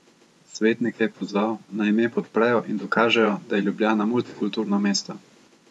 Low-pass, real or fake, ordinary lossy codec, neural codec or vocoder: none; fake; none; vocoder, 24 kHz, 100 mel bands, Vocos